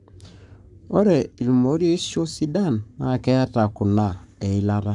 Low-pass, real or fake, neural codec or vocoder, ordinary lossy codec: 10.8 kHz; fake; codec, 44.1 kHz, 7.8 kbps, Pupu-Codec; none